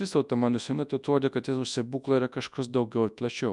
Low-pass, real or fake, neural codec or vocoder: 10.8 kHz; fake; codec, 24 kHz, 0.9 kbps, WavTokenizer, large speech release